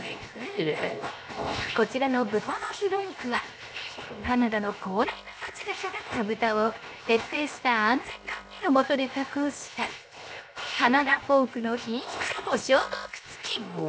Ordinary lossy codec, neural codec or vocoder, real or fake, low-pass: none; codec, 16 kHz, 0.7 kbps, FocalCodec; fake; none